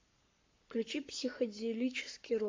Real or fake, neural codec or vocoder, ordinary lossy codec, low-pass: fake; codec, 44.1 kHz, 7.8 kbps, Pupu-Codec; MP3, 48 kbps; 7.2 kHz